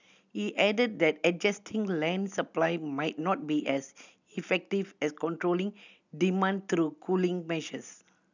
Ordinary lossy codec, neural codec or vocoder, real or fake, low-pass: none; none; real; 7.2 kHz